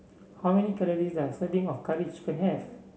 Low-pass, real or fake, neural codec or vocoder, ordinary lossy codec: none; real; none; none